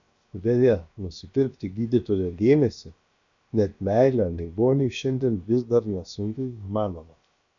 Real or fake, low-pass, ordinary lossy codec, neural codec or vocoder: fake; 7.2 kHz; MP3, 96 kbps; codec, 16 kHz, about 1 kbps, DyCAST, with the encoder's durations